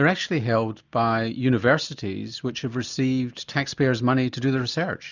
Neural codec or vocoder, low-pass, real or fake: none; 7.2 kHz; real